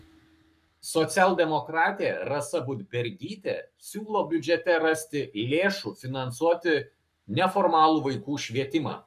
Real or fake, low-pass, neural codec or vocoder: fake; 14.4 kHz; codec, 44.1 kHz, 7.8 kbps, Pupu-Codec